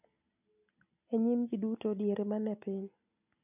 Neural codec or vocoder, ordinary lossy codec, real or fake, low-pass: none; none; real; 3.6 kHz